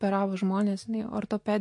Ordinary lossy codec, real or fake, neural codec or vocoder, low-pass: MP3, 48 kbps; real; none; 10.8 kHz